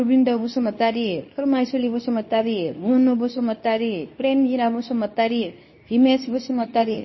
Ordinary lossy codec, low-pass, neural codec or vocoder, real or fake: MP3, 24 kbps; 7.2 kHz; codec, 24 kHz, 0.9 kbps, WavTokenizer, medium speech release version 2; fake